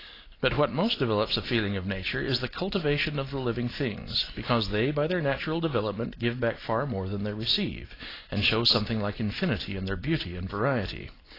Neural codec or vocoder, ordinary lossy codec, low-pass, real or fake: none; AAC, 24 kbps; 5.4 kHz; real